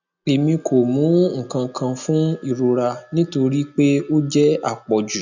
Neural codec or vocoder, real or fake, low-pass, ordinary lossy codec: none; real; 7.2 kHz; none